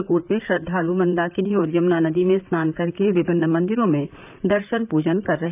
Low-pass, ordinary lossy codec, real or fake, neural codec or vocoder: 3.6 kHz; none; fake; vocoder, 44.1 kHz, 128 mel bands, Pupu-Vocoder